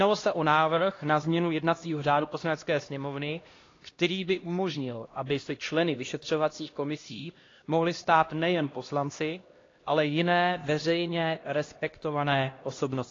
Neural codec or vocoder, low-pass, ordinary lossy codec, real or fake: codec, 16 kHz, 1 kbps, X-Codec, HuBERT features, trained on LibriSpeech; 7.2 kHz; AAC, 32 kbps; fake